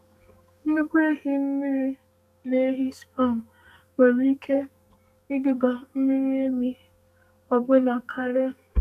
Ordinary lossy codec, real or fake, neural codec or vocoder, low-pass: none; fake; codec, 32 kHz, 1.9 kbps, SNAC; 14.4 kHz